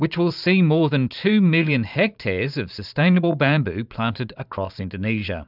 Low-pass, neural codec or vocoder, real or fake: 5.4 kHz; vocoder, 44.1 kHz, 128 mel bands every 512 samples, BigVGAN v2; fake